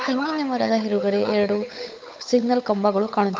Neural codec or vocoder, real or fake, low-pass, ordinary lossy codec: codec, 16 kHz, 8 kbps, FunCodec, trained on Chinese and English, 25 frames a second; fake; none; none